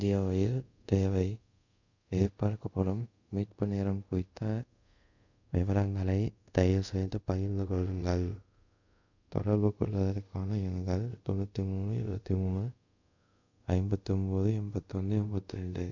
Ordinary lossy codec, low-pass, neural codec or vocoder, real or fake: none; 7.2 kHz; codec, 24 kHz, 0.5 kbps, DualCodec; fake